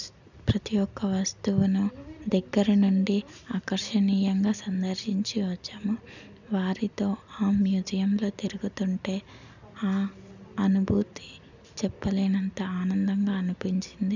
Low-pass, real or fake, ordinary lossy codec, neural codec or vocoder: 7.2 kHz; real; none; none